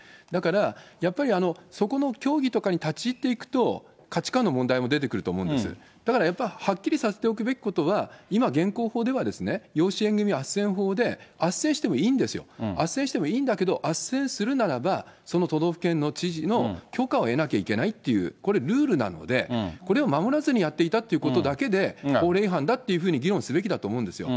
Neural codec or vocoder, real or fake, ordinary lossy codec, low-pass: none; real; none; none